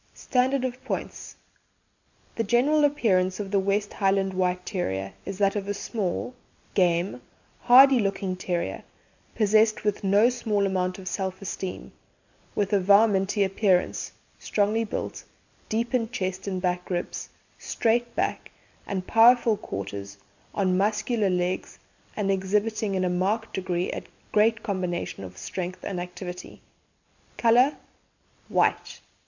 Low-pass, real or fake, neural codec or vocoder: 7.2 kHz; real; none